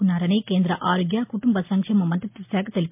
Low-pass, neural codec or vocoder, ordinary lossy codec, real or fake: 3.6 kHz; none; MP3, 32 kbps; real